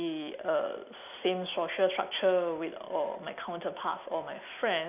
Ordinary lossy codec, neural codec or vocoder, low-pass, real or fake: none; none; 3.6 kHz; real